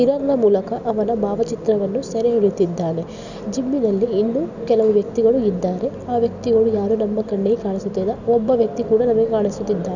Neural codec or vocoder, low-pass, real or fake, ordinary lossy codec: none; 7.2 kHz; real; none